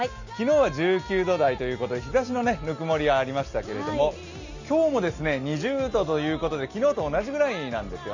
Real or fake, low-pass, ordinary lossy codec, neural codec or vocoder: real; 7.2 kHz; none; none